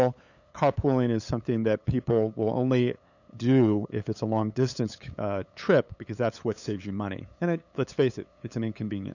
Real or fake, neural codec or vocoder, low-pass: fake; codec, 16 kHz, 8 kbps, FunCodec, trained on LibriTTS, 25 frames a second; 7.2 kHz